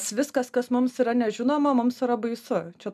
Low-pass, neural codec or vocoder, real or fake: 14.4 kHz; none; real